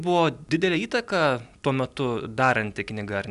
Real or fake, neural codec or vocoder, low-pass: real; none; 10.8 kHz